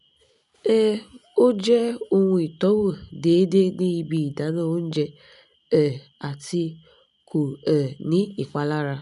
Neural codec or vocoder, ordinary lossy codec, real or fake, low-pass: none; none; real; 10.8 kHz